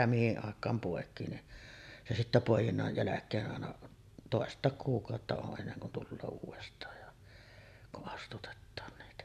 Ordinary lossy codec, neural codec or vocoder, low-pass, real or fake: none; none; 14.4 kHz; real